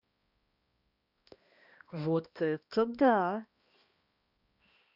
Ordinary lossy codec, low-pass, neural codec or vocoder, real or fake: Opus, 64 kbps; 5.4 kHz; codec, 16 kHz, 1 kbps, X-Codec, HuBERT features, trained on balanced general audio; fake